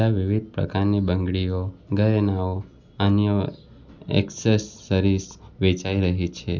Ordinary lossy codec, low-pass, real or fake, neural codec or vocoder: none; 7.2 kHz; real; none